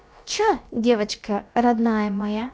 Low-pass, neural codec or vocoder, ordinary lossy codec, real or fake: none; codec, 16 kHz, about 1 kbps, DyCAST, with the encoder's durations; none; fake